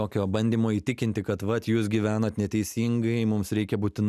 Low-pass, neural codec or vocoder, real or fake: 14.4 kHz; none; real